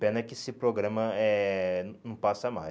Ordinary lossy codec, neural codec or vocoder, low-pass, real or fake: none; none; none; real